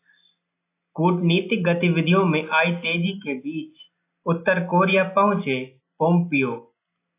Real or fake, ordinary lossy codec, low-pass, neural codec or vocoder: real; AAC, 24 kbps; 3.6 kHz; none